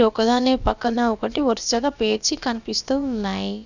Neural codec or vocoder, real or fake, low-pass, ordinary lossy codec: codec, 16 kHz, about 1 kbps, DyCAST, with the encoder's durations; fake; 7.2 kHz; none